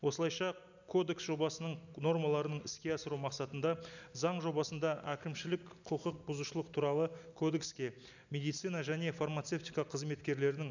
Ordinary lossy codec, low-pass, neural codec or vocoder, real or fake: none; 7.2 kHz; none; real